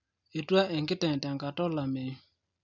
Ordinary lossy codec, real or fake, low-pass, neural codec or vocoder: none; real; 7.2 kHz; none